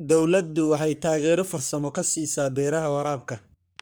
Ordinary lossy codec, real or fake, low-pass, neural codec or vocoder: none; fake; none; codec, 44.1 kHz, 3.4 kbps, Pupu-Codec